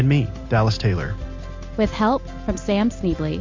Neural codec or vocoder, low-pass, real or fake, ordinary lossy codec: none; 7.2 kHz; real; MP3, 48 kbps